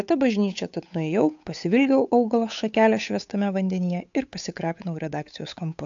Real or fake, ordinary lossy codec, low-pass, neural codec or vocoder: fake; AAC, 64 kbps; 7.2 kHz; codec, 16 kHz, 8 kbps, FunCodec, trained on Chinese and English, 25 frames a second